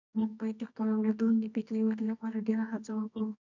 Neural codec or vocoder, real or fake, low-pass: codec, 24 kHz, 0.9 kbps, WavTokenizer, medium music audio release; fake; 7.2 kHz